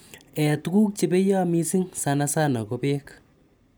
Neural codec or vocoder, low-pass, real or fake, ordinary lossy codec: none; none; real; none